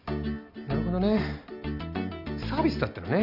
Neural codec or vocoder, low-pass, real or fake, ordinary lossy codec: none; 5.4 kHz; real; none